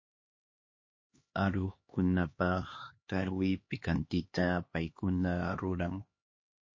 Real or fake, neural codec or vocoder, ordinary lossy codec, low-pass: fake; codec, 16 kHz, 2 kbps, X-Codec, HuBERT features, trained on LibriSpeech; MP3, 32 kbps; 7.2 kHz